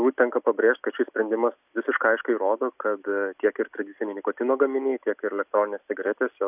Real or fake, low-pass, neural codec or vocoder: real; 3.6 kHz; none